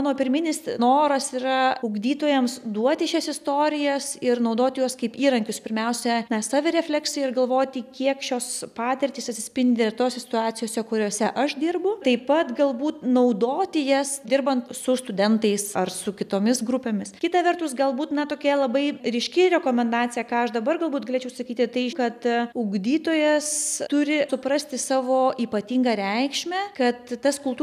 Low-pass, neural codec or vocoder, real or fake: 14.4 kHz; none; real